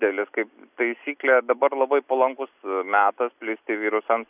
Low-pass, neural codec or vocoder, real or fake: 3.6 kHz; none; real